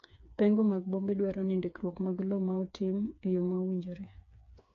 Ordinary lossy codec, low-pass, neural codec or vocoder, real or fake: AAC, 48 kbps; 7.2 kHz; codec, 16 kHz, 4 kbps, FreqCodec, smaller model; fake